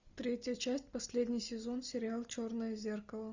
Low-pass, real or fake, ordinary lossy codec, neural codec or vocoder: 7.2 kHz; real; Opus, 64 kbps; none